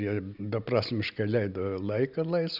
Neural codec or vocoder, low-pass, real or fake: none; 5.4 kHz; real